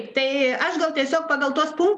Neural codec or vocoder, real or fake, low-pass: none; real; 10.8 kHz